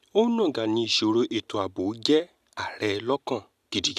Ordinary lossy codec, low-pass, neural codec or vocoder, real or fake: none; 14.4 kHz; none; real